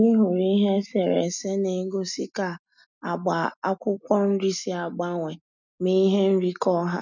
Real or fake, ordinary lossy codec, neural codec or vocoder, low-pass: real; none; none; 7.2 kHz